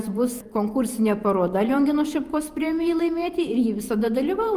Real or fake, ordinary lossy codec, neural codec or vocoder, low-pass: fake; Opus, 32 kbps; vocoder, 48 kHz, 128 mel bands, Vocos; 14.4 kHz